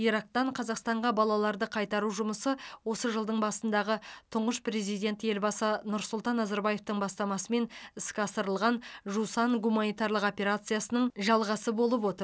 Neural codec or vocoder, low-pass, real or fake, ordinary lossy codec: none; none; real; none